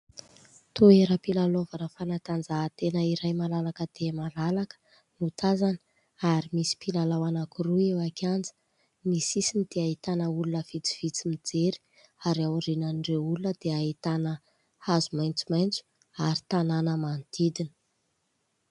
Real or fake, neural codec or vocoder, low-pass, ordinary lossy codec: real; none; 10.8 kHz; MP3, 96 kbps